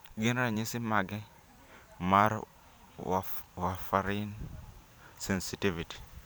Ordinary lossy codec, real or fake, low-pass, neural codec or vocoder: none; fake; none; vocoder, 44.1 kHz, 128 mel bands every 512 samples, BigVGAN v2